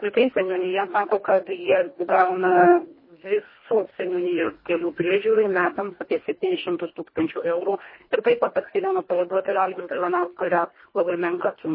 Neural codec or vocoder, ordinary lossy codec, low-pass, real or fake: codec, 24 kHz, 1.5 kbps, HILCodec; MP3, 24 kbps; 5.4 kHz; fake